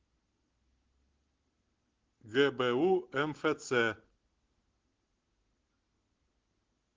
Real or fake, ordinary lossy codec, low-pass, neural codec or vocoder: real; Opus, 16 kbps; 7.2 kHz; none